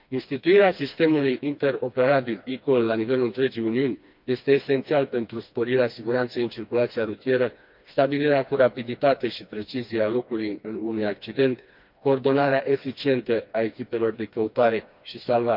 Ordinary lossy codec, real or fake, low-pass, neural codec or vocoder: MP3, 48 kbps; fake; 5.4 kHz; codec, 16 kHz, 2 kbps, FreqCodec, smaller model